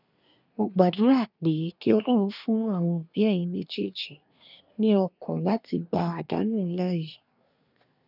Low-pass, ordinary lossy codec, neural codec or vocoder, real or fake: 5.4 kHz; none; codec, 24 kHz, 1 kbps, SNAC; fake